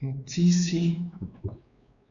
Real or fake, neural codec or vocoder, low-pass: fake; codec, 16 kHz, 2 kbps, X-Codec, WavLM features, trained on Multilingual LibriSpeech; 7.2 kHz